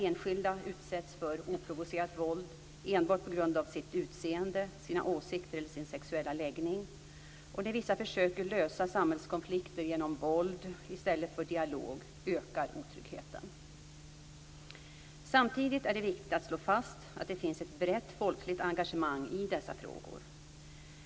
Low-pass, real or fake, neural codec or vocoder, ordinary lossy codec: none; real; none; none